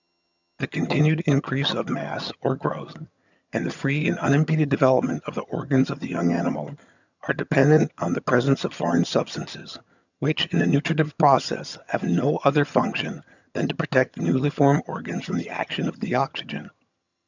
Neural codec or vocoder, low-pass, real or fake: vocoder, 22.05 kHz, 80 mel bands, HiFi-GAN; 7.2 kHz; fake